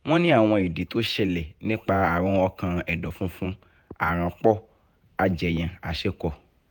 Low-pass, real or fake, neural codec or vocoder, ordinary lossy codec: none; fake; vocoder, 48 kHz, 128 mel bands, Vocos; none